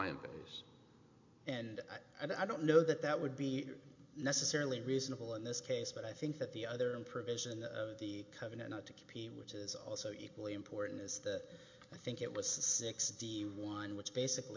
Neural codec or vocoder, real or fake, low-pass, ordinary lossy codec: none; real; 7.2 kHz; MP3, 48 kbps